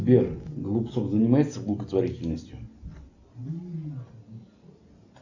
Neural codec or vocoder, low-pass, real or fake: none; 7.2 kHz; real